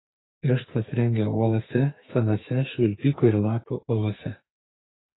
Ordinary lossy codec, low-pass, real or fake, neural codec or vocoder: AAC, 16 kbps; 7.2 kHz; fake; codec, 44.1 kHz, 2.6 kbps, SNAC